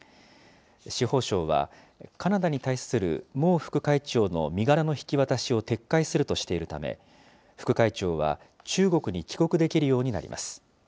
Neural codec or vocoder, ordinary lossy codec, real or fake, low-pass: none; none; real; none